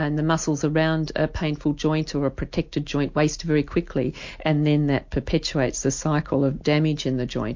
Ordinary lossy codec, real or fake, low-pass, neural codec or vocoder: MP3, 48 kbps; real; 7.2 kHz; none